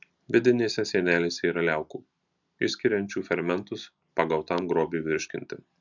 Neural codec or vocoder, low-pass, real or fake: none; 7.2 kHz; real